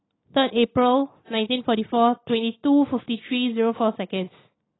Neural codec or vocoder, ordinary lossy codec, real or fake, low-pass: none; AAC, 16 kbps; real; 7.2 kHz